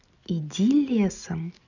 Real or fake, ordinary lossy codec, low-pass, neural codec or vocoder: real; none; 7.2 kHz; none